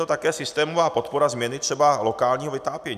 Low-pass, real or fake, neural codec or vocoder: 14.4 kHz; real; none